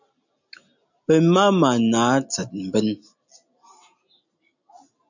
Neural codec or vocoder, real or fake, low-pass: none; real; 7.2 kHz